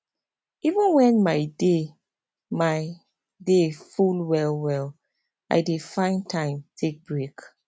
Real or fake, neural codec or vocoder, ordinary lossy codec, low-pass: real; none; none; none